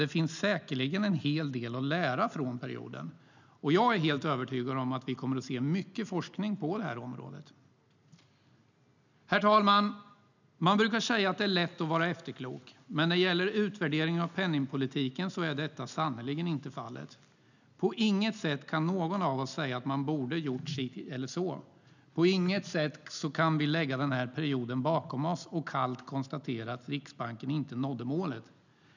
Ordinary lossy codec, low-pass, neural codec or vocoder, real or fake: none; 7.2 kHz; none; real